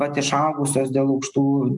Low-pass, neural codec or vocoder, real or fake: 10.8 kHz; none; real